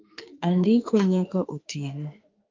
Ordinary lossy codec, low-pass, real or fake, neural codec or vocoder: Opus, 24 kbps; 7.2 kHz; fake; autoencoder, 48 kHz, 32 numbers a frame, DAC-VAE, trained on Japanese speech